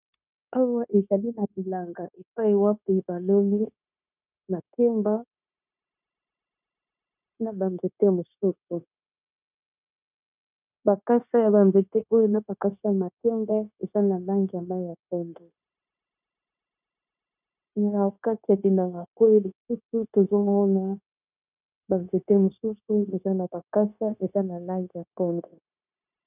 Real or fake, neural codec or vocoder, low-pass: fake; codec, 16 kHz, 0.9 kbps, LongCat-Audio-Codec; 3.6 kHz